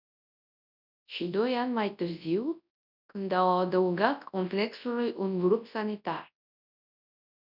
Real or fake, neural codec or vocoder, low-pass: fake; codec, 24 kHz, 0.9 kbps, WavTokenizer, large speech release; 5.4 kHz